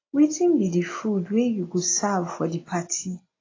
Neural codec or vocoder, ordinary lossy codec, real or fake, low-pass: none; AAC, 32 kbps; real; 7.2 kHz